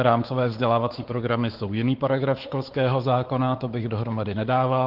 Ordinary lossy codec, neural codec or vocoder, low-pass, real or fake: Opus, 16 kbps; codec, 16 kHz, 4 kbps, X-Codec, WavLM features, trained on Multilingual LibriSpeech; 5.4 kHz; fake